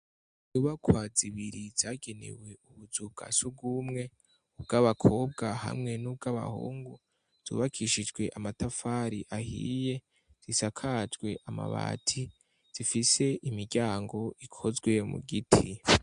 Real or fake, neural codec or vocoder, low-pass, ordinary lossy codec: real; none; 9.9 kHz; MP3, 64 kbps